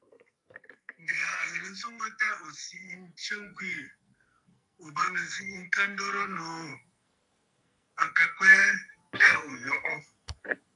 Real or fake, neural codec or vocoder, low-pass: fake; codec, 44.1 kHz, 2.6 kbps, SNAC; 10.8 kHz